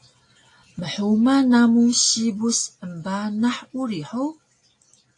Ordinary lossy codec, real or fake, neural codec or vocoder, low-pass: AAC, 48 kbps; real; none; 10.8 kHz